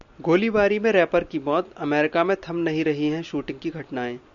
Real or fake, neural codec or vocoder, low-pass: real; none; 7.2 kHz